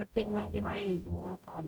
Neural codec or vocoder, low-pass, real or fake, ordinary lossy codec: codec, 44.1 kHz, 0.9 kbps, DAC; 19.8 kHz; fake; none